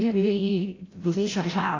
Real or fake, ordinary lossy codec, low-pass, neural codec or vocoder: fake; AAC, 32 kbps; 7.2 kHz; codec, 16 kHz, 0.5 kbps, FreqCodec, larger model